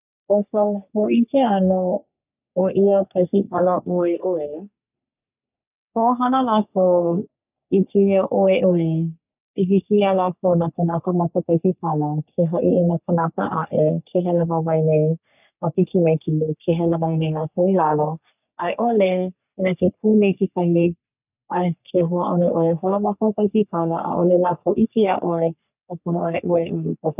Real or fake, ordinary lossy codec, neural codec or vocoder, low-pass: fake; none; codec, 44.1 kHz, 3.4 kbps, Pupu-Codec; 3.6 kHz